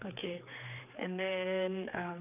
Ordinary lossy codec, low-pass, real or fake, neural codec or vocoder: none; 3.6 kHz; fake; codec, 16 kHz, 4 kbps, X-Codec, HuBERT features, trained on general audio